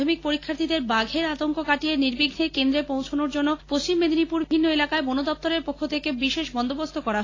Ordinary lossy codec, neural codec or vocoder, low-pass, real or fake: AAC, 32 kbps; none; 7.2 kHz; real